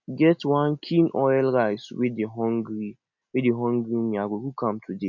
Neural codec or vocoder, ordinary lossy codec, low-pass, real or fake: none; none; 7.2 kHz; real